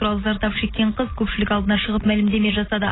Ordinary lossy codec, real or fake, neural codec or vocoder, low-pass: AAC, 16 kbps; real; none; 7.2 kHz